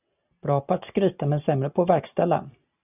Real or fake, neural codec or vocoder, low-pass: real; none; 3.6 kHz